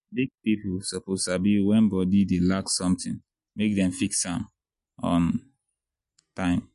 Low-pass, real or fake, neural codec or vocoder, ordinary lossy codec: 14.4 kHz; real; none; MP3, 48 kbps